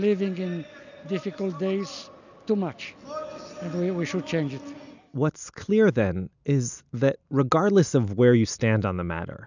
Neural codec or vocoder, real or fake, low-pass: none; real; 7.2 kHz